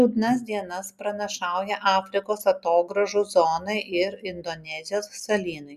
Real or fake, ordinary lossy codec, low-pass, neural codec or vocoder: real; Opus, 64 kbps; 14.4 kHz; none